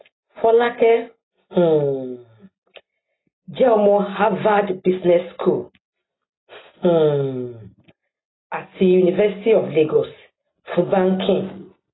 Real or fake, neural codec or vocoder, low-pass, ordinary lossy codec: real; none; 7.2 kHz; AAC, 16 kbps